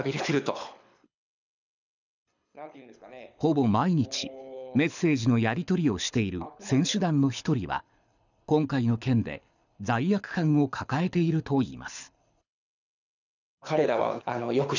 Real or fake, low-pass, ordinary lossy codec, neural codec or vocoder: fake; 7.2 kHz; none; codec, 24 kHz, 6 kbps, HILCodec